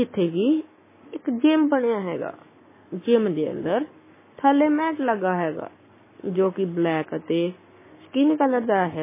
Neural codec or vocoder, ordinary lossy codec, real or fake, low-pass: codec, 44.1 kHz, 7.8 kbps, Pupu-Codec; MP3, 16 kbps; fake; 3.6 kHz